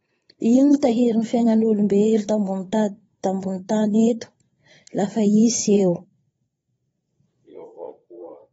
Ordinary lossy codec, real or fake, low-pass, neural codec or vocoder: AAC, 24 kbps; fake; 9.9 kHz; vocoder, 22.05 kHz, 80 mel bands, Vocos